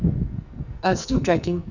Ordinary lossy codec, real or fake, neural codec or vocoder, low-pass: none; fake; codec, 44.1 kHz, 2.6 kbps, SNAC; 7.2 kHz